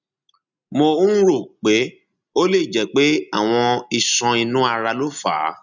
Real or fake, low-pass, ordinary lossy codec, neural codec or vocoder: real; 7.2 kHz; none; none